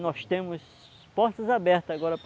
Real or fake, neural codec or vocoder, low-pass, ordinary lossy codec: real; none; none; none